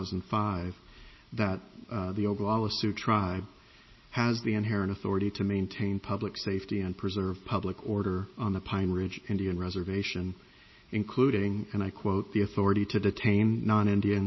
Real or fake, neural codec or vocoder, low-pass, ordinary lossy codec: real; none; 7.2 kHz; MP3, 24 kbps